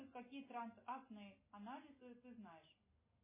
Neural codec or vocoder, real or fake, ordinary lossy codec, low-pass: none; real; MP3, 16 kbps; 3.6 kHz